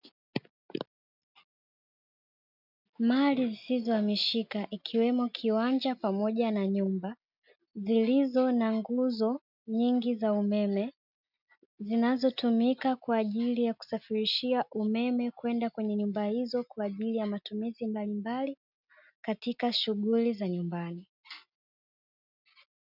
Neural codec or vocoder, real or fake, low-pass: none; real; 5.4 kHz